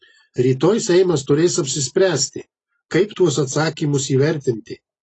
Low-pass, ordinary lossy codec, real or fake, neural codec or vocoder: 10.8 kHz; AAC, 32 kbps; real; none